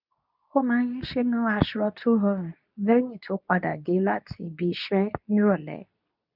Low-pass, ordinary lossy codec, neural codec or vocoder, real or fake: 5.4 kHz; none; codec, 24 kHz, 0.9 kbps, WavTokenizer, medium speech release version 2; fake